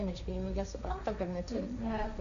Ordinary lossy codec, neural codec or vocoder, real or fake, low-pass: MP3, 96 kbps; codec, 16 kHz, 1.1 kbps, Voila-Tokenizer; fake; 7.2 kHz